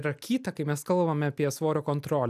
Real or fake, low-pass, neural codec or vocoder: real; 14.4 kHz; none